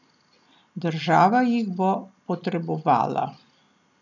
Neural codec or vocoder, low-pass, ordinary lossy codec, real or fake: none; 7.2 kHz; none; real